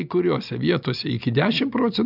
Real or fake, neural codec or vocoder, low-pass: real; none; 5.4 kHz